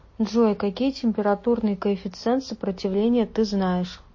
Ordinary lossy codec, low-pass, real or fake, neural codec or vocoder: MP3, 32 kbps; 7.2 kHz; real; none